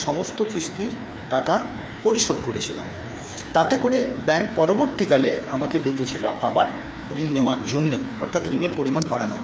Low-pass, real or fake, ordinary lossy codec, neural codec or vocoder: none; fake; none; codec, 16 kHz, 2 kbps, FreqCodec, larger model